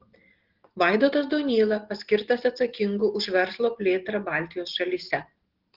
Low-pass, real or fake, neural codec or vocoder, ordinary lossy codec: 5.4 kHz; real; none; Opus, 16 kbps